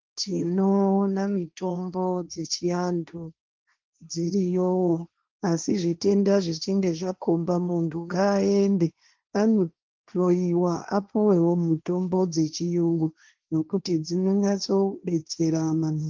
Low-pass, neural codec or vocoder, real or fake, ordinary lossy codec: 7.2 kHz; codec, 16 kHz, 1.1 kbps, Voila-Tokenizer; fake; Opus, 32 kbps